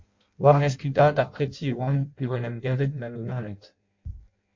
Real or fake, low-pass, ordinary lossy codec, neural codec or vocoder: fake; 7.2 kHz; MP3, 48 kbps; codec, 16 kHz in and 24 kHz out, 0.6 kbps, FireRedTTS-2 codec